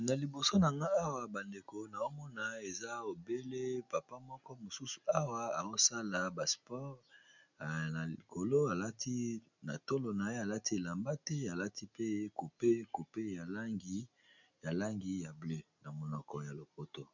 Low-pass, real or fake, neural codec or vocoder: 7.2 kHz; real; none